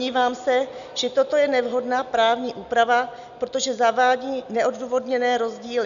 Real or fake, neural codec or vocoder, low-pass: real; none; 7.2 kHz